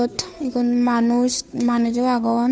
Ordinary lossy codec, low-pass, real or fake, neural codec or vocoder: none; none; fake; codec, 16 kHz, 2 kbps, FunCodec, trained on Chinese and English, 25 frames a second